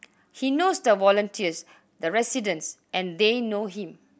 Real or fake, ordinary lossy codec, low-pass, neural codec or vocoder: real; none; none; none